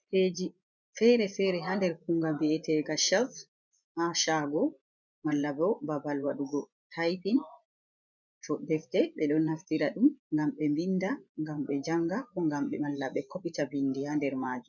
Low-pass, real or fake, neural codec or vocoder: 7.2 kHz; fake; vocoder, 24 kHz, 100 mel bands, Vocos